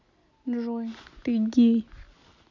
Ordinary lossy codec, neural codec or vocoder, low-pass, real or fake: none; none; 7.2 kHz; real